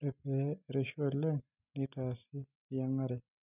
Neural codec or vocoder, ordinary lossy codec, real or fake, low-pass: none; none; real; 3.6 kHz